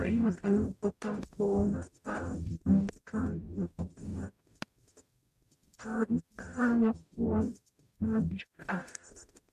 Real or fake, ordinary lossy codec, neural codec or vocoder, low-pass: fake; MP3, 64 kbps; codec, 44.1 kHz, 0.9 kbps, DAC; 14.4 kHz